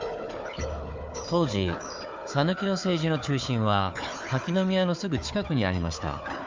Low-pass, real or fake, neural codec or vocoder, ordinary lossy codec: 7.2 kHz; fake; codec, 16 kHz, 4 kbps, FunCodec, trained on Chinese and English, 50 frames a second; MP3, 64 kbps